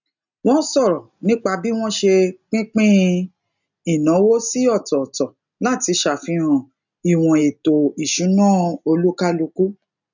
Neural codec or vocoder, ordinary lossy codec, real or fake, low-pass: none; none; real; 7.2 kHz